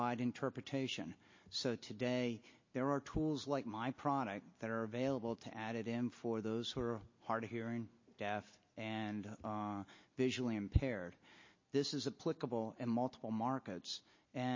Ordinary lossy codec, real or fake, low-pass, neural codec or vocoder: MP3, 32 kbps; real; 7.2 kHz; none